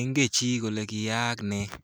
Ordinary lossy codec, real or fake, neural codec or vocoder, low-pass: none; real; none; none